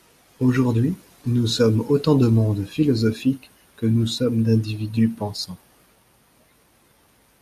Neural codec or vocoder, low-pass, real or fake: none; 14.4 kHz; real